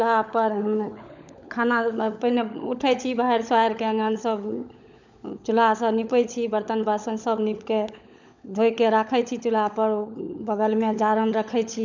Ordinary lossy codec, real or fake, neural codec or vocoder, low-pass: none; fake; codec, 16 kHz, 16 kbps, FunCodec, trained on LibriTTS, 50 frames a second; 7.2 kHz